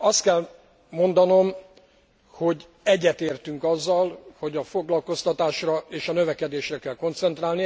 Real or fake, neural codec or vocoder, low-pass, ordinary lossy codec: real; none; none; none